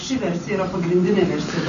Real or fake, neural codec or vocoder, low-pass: real; none; 7.2 kHz